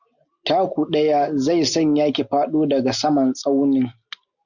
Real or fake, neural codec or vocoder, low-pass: real; none; 7.2 kHz